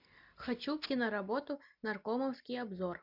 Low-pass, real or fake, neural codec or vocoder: 5.4 kHz; real; none